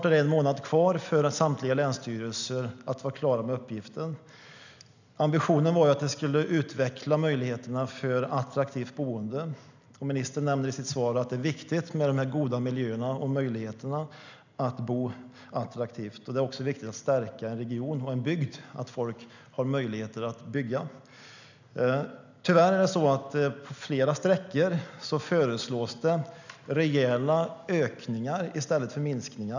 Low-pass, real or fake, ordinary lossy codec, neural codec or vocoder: 7.2 kHz; real; none; none